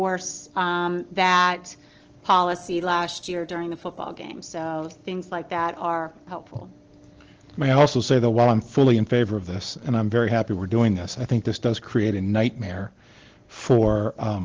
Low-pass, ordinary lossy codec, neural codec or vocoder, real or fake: 7.2 kHz; Opus, 16 kbps; none; real